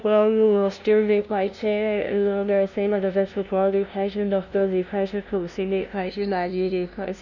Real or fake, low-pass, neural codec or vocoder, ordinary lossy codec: fake; 7.2 kHz; codec, 16 kHz, 0.5 kbps, FunCodec, trained on LibriTTS, 25 frames a second; none